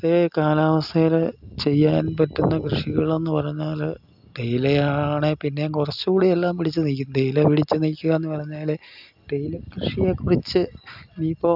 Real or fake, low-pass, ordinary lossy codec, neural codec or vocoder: real; 5.4 kHz; none; none